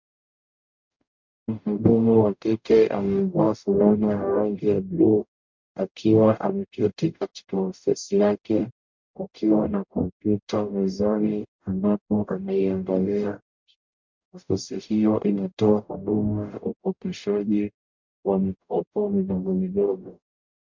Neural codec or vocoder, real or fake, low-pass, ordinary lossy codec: codec, 44.1 kHz, 0.9 kbps, DAC; fake; 7.2 kHz; MP3, 64 kbps